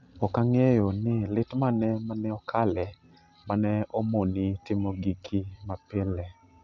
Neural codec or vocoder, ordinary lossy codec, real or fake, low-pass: none; none; real; 7.2 kHz